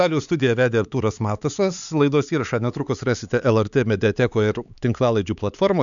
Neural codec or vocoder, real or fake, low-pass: codec, 16 kHz, 4 kbps, X-Codec, HuBERT features, trained on LibriSpeech; fake; 7.2 kHz